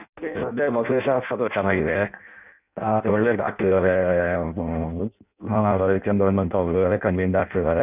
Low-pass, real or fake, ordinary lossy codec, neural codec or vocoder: 3.6 kHz; fake; none; codec, 16 kHz in and 24 kHz out, 0.6 kbps, FireRedTTS-2 codec